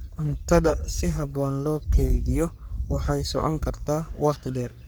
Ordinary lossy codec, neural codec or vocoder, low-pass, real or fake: none; codec, 44.1 kHz, 3.4 kbps, Pupu-Codec; none; fake